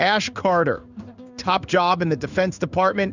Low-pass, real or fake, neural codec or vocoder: 7.2 kHz; fake; codec, 16 kHz in and 24 kHz out, 1 kbps, XY-Tokenizer